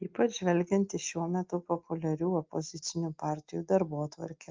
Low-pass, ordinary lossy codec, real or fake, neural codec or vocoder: 7.2 kHz; Opus, 32 kbps; real; none